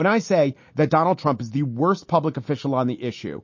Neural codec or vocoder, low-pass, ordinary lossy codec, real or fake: none; 7.2 kHz; MP3, 32 kbps; real